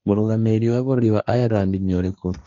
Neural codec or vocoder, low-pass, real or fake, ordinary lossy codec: codec, 16 kHz, 1.1 kbps, Voila-Tokenizer; 7.2 kHz; fake; none